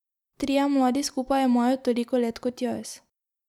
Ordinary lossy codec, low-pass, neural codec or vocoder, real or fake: none; 19.8 kHz; none; real